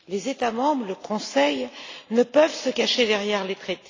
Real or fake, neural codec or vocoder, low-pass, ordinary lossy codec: real; none; 7.2 kHz; AAC, 32 kbps